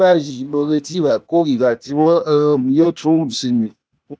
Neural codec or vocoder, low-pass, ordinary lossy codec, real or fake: codec, 16 kHz, 0.8 kbps, ZipCodec; none; none; fake